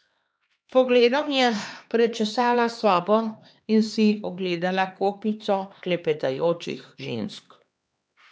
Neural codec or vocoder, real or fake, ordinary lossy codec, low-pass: codec, 16 kHz, 2 kbps, X-Codec, HuBERT features, trained on LibriSpeech; fake; none; none